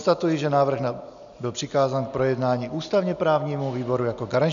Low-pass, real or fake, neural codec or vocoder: 7.2 kHz; real; none